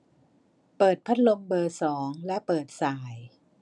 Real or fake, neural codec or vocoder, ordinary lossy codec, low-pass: real; none; none; 10.8 kHz